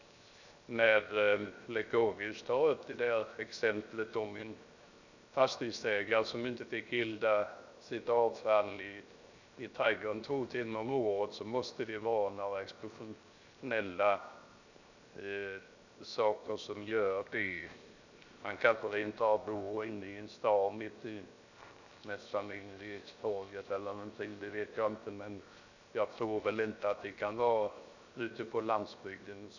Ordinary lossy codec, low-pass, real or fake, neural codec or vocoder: none; 7.2 kHz; fake; codec, 16 kHz, 0.7 kbps, FocalCodec